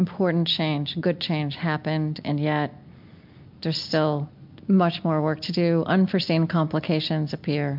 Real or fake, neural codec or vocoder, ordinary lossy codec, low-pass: real; none; MP3, 48 kbps; 5.4 kHz